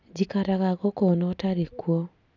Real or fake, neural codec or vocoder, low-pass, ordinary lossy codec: real; none; 7.2 kHz; none